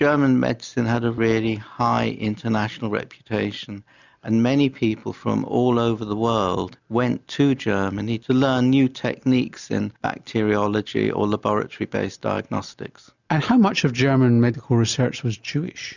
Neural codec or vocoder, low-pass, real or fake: none; 7.2 kHz; real